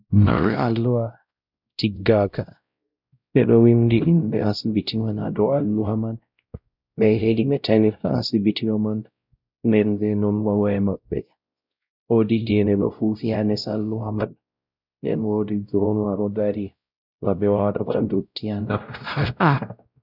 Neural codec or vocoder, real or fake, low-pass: codec, 16 kHz, 0.5 kbps, X-Codec, WavLM features, trained on Multilingual LibriSpeech; fake; 5.4 kHz